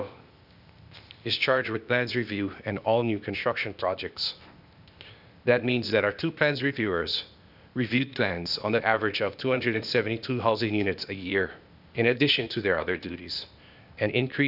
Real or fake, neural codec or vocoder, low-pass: fake; codec, 16 kHz, 0.8 kbps, ZipCodec; 5.4 kHz